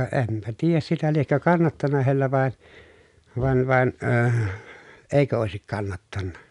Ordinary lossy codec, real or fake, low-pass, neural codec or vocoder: none; real; 10.8 kHz; none